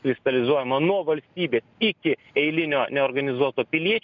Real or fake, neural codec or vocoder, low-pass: real; none; 7.2 kHz